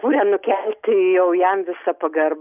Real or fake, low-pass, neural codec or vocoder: real; 3.6 kHz; none